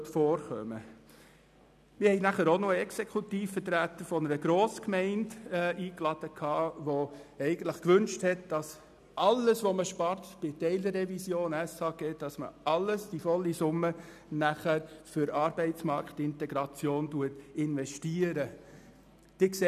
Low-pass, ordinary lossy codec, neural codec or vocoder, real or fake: 14.4 kHz; none; none; real